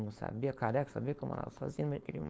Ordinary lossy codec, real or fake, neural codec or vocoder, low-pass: none; fake; codec, 16 kHz, 4.8 kbps, FACodec; none